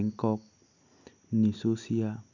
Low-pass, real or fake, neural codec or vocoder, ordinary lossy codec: 7.2 kHz; real; none; none